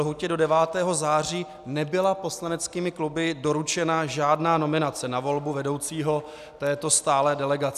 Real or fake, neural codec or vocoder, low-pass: real; none; 14.4 kHz